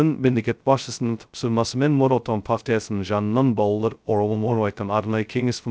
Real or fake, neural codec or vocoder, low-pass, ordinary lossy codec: fake; codec, 16 kHz, 0.2 kbps, FocalCodec; none; none